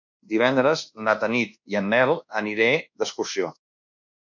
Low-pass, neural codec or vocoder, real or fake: 7.2 kHz; codec, 24 kHz, 1.2 kbps, DualCodec; fake